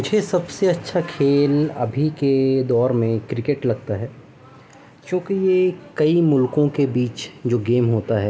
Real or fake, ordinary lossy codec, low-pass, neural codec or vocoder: real; none; none; none